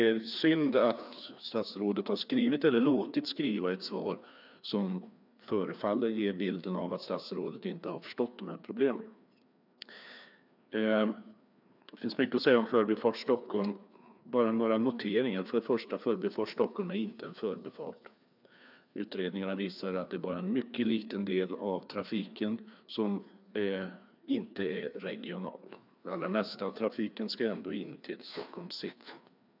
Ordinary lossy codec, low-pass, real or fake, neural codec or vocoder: none; 5.4 kHz; fake; codec, 16 kHz, 2 kbps, FreqCodec, larger model